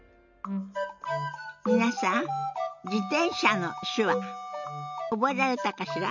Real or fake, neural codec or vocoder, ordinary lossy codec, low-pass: real; none; none; 7.2 kHz